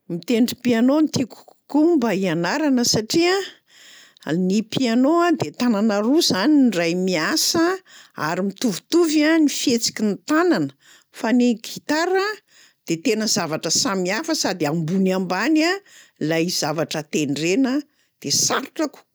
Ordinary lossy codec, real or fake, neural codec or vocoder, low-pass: none; real; none; none